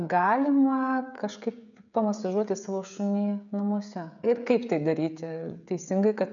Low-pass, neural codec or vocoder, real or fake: 7.2 kHz; codec, 16 kHz, 16 kbps, FreqCodec, smaller model; fake